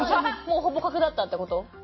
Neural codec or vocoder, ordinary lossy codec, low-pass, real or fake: none; MP3, 24 kbps; 7.2 kHz; real